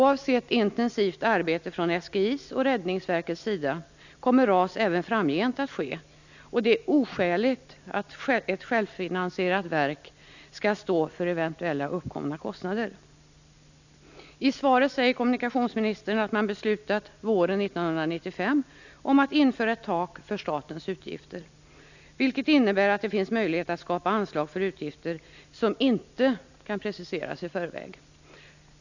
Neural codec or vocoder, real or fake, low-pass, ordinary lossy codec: none; real; 7.2 kHz; none